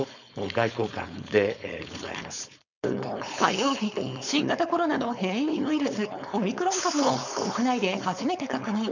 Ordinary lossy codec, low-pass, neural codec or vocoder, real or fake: none; 7.2 kHz; codec, 16 kHz, 4.8 kbps, FACodec; fake